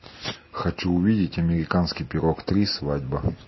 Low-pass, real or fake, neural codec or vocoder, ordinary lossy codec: 7.2 kHz; real; none; MP3, 24 kbps